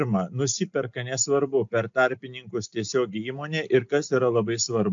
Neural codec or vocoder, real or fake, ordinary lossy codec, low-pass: none; real; AAC, 64 kbps; 7.2 kHz